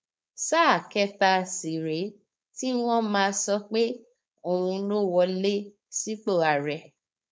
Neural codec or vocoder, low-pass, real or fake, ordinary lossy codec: codec, 16 kHz, 4.8 kbps, FACodec; none; fake; none